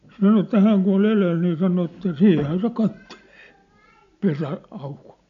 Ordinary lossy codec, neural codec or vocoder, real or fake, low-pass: none; none; real; 7.2 kHz